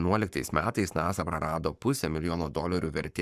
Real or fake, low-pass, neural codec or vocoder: fake; 14.4 kHz; codec, 44.1 kHz, 7.8 kbps, Pupu-Codec